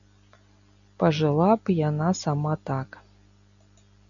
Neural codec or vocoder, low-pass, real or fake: none; 7.2 kHz; real